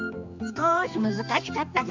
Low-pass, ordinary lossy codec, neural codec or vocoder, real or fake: 7.2 kHz; MP3, 64 kbps; codec, 16 kHz, 2 kbps, X-Codec, HuBERT features, trained on general audio; fake